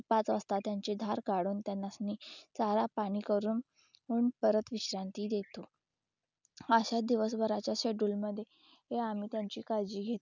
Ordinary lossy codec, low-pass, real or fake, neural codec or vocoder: none; 7.2 kHz; real; none